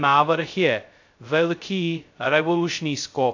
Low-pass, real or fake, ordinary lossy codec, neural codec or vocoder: 7.2 kHz; fake; AAC, 48 kbps; codec, 16 kHz, 0.2 kbps, FocalCodec